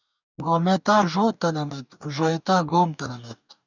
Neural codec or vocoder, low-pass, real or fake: codec, 44.1 kHz, 2.6 kbps, DAC; 7.2 kHz; fake